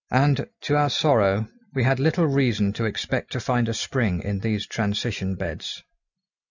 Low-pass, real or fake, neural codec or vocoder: 7.2 kHz; real; none